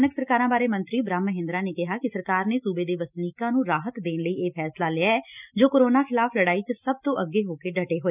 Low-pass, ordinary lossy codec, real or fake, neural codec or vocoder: 3.6 kHz; none; real; none